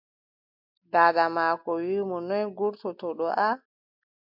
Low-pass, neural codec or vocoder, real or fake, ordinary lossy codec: 5.4 kHz; none; real; MP3, 48 kbps